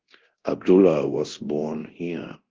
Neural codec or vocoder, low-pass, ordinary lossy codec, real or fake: codec, 24 kHz, 0.9 kbps, DualCodec; 7.2 kHz; Opus, 16 kbps; fake